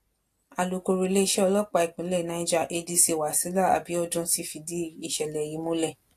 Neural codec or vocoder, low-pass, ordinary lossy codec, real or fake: none; 14.4 kHz; AAC, 64 kbps; real